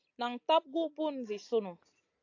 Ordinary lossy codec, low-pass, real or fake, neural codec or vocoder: AAC, 48 kbps; 7.2 kHz; real; none